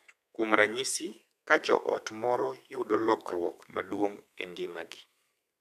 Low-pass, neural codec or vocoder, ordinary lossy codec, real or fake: 14.4 kHz; codec, 32 kHz, 1.9 kbps, SNAC; none; fake